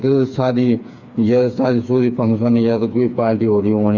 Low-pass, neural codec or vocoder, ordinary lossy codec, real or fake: 7.2 kHz; codec, 16 kHz, 4 kbps, FreqCodec, smaller model; Opus, 64 kbps; fake